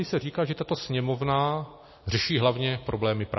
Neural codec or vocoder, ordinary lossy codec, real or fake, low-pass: none; MP3, 24 kbps; real; 7.2 kHz